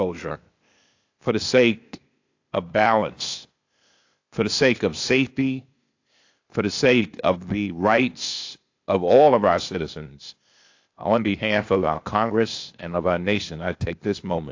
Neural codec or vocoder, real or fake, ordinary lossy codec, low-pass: codec, 16 kHz, 0.8 kbps, ZipCodec; fake; AAC, 48 kbps; 7.2 kHz